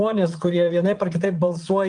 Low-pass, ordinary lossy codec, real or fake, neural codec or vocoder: 9.9 kHz; Opus, 32 kbps; fake; vocoder, 22.05 kHz, 80 mel bands, Vocos